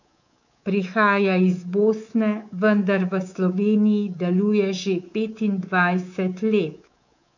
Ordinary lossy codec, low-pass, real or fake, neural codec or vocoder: none; 7.2 kHz; fake; codec, 24 kHz, 3.1 kbps, DualCodec